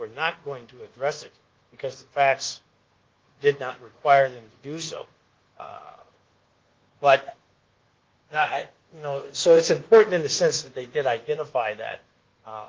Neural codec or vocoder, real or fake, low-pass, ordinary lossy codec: codec, 24 kHz, 1.2 kbps, DualCodec; fake; 7.2 kHz; Opus, 16 kbps